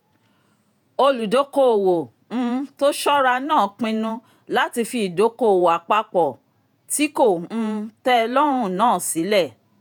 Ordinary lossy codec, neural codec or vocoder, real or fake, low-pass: none; vocoder, 48 kHz, 128 mel bands, Vocos; fake; 19.8 kHz